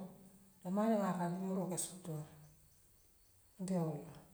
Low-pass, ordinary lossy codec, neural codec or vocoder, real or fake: none; none; none; real